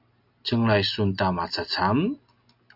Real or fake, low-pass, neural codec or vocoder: real; 5.4 kHz; none